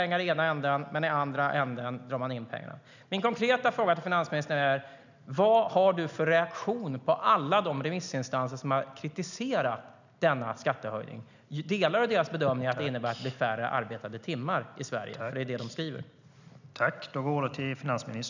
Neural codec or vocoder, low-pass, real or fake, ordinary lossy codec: none; 7.2 kHz; real; none